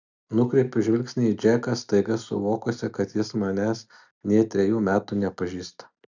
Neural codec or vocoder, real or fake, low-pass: none; real; 7.2 kHz